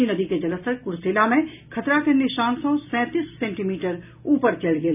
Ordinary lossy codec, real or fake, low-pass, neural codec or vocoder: none; real; 3.6 kHz; none